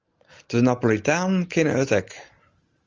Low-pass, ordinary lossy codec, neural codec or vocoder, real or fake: 7.2 kHz; Opus, 32 kbps; none; real